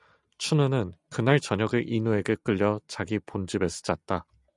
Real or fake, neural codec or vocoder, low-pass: real; none; 10.8 kHz